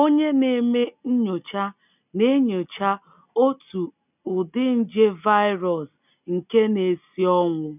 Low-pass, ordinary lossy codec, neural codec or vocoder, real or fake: 3.6 kHz; none; none; real